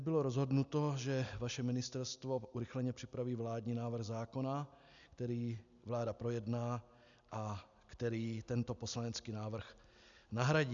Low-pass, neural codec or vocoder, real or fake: 7.2 kHz; none; real